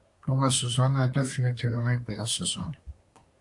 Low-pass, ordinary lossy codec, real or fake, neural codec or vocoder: 10.8 kHz; AAC, 64 kbps; fake; codec, 24 kHz, 1 kbps, SNAC